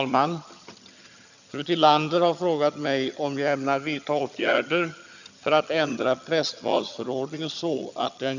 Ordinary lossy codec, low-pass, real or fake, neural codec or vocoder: none; 7.2 kHz; fake; vocoder, 22.05 kHz, 80 mel bands, HiFi-GAN